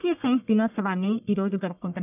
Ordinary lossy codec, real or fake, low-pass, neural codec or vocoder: none; fake; 3.6 kHz; codec, 44.1 kHz, 1.7 kbps, Pupu-Codec